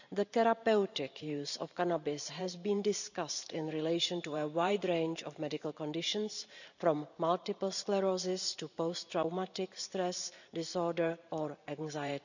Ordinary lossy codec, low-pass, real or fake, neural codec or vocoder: none; 7.2 kHz; fake; vocoder, 44.1 kHz, 128 mel bands every 512 samples, BigVGAN v2